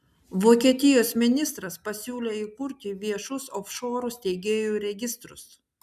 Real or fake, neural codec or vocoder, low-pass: real; none; 14.4 kHz